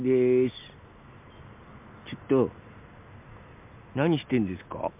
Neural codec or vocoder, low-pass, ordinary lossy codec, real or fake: none; 3.6 kHz; MP3, 32 kbps; real